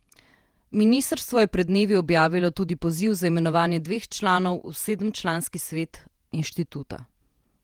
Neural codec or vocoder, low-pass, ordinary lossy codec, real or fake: vocoder, 48 kHz, 128 mel bands, Vocos; 19.8 kHz; Opus, 24 kbps; fake